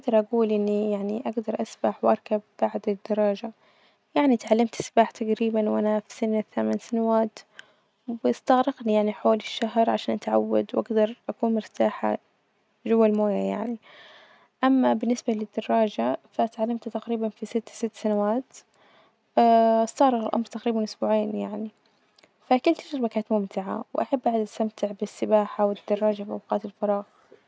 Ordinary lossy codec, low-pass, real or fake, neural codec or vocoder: none; none; real; none